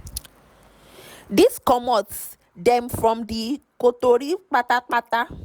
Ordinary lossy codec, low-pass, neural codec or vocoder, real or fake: none; none; none; real